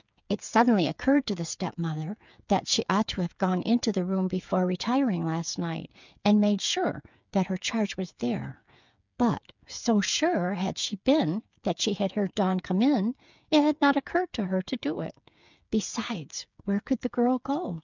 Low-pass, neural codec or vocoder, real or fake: 7.2 kHz; codec, 16 kHz, 8 kbps, FreqCodec, smaller model; fake